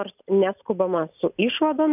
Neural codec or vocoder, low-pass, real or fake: none; 3.6 kHz; real